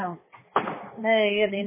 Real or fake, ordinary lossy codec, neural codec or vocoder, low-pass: fake; MP3, 16 kbps; codec, 16 kHz, 2 kbps, X-Codec, HuBERT features, trained on balanced general audio; 3.6 kHz